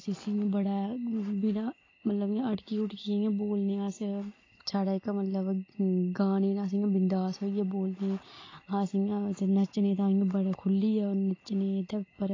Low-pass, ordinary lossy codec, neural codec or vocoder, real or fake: 7.2 kHz; AAC, 32 kbps; none; real